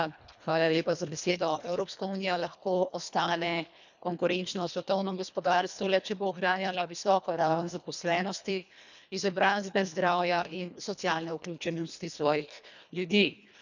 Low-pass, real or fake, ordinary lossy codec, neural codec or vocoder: 7.2 kHz; fake; none; codec, 24 kHz, 1.5 kbps, HILCodec